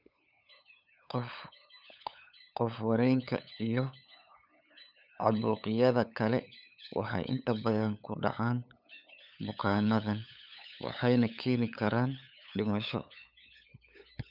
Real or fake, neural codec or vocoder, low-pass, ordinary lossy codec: fake; codec, 16 kHz, 8 kbps, FunCodec, trained on LibriTTS, 25 frames a second; 5.4 kHz; none